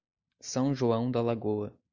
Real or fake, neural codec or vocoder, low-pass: real; none; 7.2 kHz